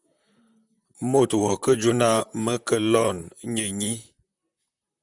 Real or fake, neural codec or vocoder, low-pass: fake; vocoder, 44.1 kHz, 128 mel bands, Pupu-Vocoder; 10.8 kHz